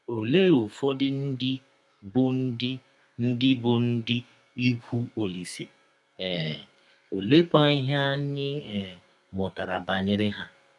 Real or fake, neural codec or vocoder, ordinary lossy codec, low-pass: fake; codec, 32 kHz, 1.9 kbps, SNAC; none; 10.8 kHz